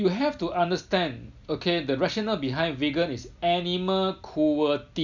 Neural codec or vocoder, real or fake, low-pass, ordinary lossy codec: none; real; 7.2 kHz; none